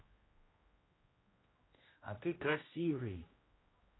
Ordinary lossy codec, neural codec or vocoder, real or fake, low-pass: AAC, 16 kbps; codec, 16 kHz, 1 kbps, X-Codec, HuBERT features, trained on general audio; fake; 7.2 kHz